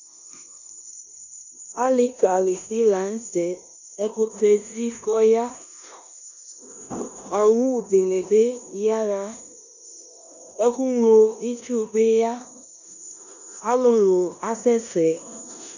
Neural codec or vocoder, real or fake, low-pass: codec, 16 kHz in and 24 kHz out, 0.9 kbps, LongCat-Audio-Codec, four codebook decoder; fake; 7.2 kHz